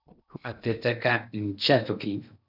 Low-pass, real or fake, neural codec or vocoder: 5.4 kHz; fake; codec, 16 kHz in and 24 kHz out, 0.8 kbps, FocalCodec, streaming, 65536 codes